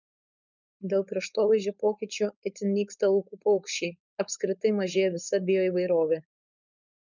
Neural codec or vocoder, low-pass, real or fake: codec, 16 kHz, 4.8 kbps, FACodec; 7.2 kHz; fake